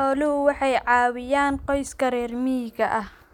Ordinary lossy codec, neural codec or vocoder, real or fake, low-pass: none; none; real; 19.8 kHz